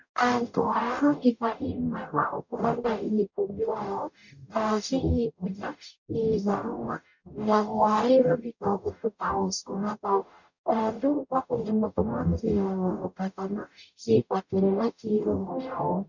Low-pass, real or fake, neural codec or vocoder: 7.2 kHz; fake; codec, 44.1 kHz, 0.9 kbps, DAC